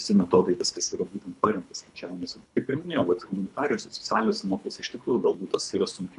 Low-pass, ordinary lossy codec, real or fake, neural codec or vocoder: 10.8 kHz; MP3, 96 kbps; fake; codec, 24 kHz, 3 kbps, HILCodec